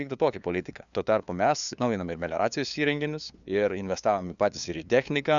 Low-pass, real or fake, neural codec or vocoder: 7.2 kHz; fake; codec, 16 kHz, 2 kbps, FunCodec, trained on LibriTTS, 25 frames a second